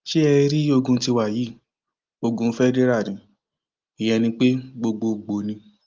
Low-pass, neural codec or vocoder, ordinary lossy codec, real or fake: 7.2 kHz; none; Opus, 32 kbps; real